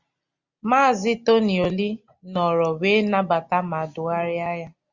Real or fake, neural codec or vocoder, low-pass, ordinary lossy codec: real; none; 7.2 kHz; Opus, 64 kbps